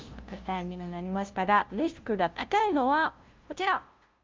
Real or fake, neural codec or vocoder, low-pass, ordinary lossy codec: fake; codec, 16 kHz, 0.5 kbps, FunCodec, trained on LibriTTS, 25 frames a second; 7.2 kHz; Opus, 24 kbps